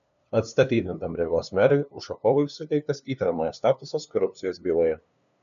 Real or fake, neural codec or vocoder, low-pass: fake; codec, 16 kHz, 2 kbps, FunCodec, trained on LibriTTS, 25 frames a second; 7.2 kHz